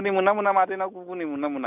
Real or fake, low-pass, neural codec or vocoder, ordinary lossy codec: real; 3.6 kHz; none; none